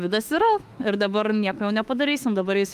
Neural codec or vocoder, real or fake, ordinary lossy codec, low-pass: autoencoder, 48 kHz, 32 numbers a frame, DAC-VAE, trained on Japanese speech; fake; Opus, 32 kbps; 14.4 kHz